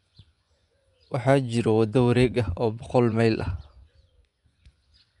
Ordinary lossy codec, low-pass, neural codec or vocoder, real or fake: none; 10.8 kHz; vocoder, 24 kHz, 100 mel bands, Vocos; fake